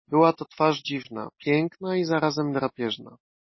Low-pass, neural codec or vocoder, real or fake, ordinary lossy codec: 7.2 kHz; none; real; MP3, 24 kbps